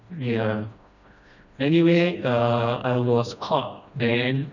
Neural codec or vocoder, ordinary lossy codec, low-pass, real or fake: codec, 16 kHz, 1 kbps, FreqCodec, smaller model; none; 7.2 kHz; fake